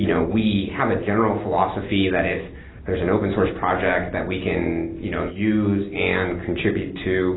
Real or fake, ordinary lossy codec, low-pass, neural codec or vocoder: real; AAC, 16 kbps; 7.2 kHz; none